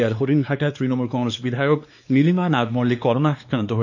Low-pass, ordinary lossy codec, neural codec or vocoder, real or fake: 7.2 kHz; none; codec, 16 kHz, 2 kbps, X-Codec, WavLM features, trained on Multilingual LibriSpeech; fake